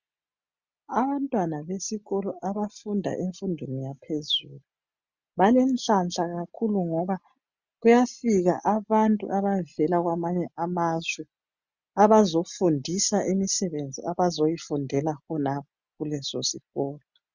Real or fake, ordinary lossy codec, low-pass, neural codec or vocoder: real; Opus, 64 kbps; 7.2 kHz; none